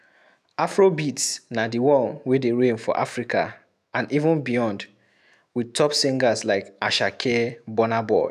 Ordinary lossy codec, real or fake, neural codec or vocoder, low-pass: none; fake; autoencoder, 48 kHz, 128 numbers a frame, DAC-VAE, trained on Japanese speech; 14.4 kHz